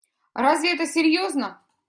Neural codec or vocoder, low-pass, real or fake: none; 9.9 kHz; real